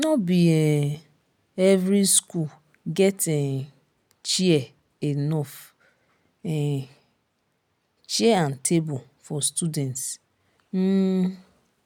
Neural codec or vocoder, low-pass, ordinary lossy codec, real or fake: none; none; none; real